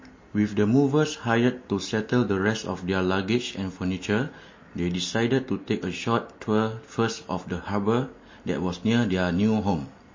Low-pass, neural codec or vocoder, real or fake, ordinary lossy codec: 7.2 kHz; none; real; MP3, 32 kbps